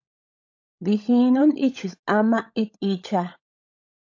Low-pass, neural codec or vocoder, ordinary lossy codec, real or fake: 7.2 kHz; codec, 16 kHz, 16 kbps, FunCodec, trained on LibriTTS, 50 frames a second; AAC, 48 kbps; fake